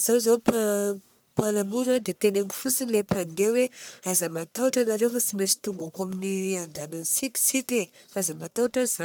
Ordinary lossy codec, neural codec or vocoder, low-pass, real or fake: none; codec, 44.1 kHz, 1.7 kbps, Pupu-Codec; none; fake